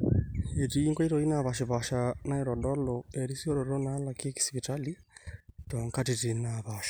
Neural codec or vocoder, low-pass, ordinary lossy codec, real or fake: none; none; none; real